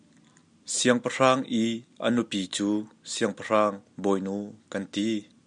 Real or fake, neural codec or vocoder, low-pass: real; none; 9.9 kHz